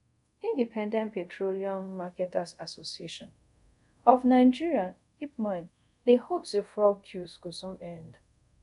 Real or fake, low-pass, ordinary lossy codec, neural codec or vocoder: fake; 10.8 kHz; none; codec, 24 kHz, 0.5 kbps, DualCodec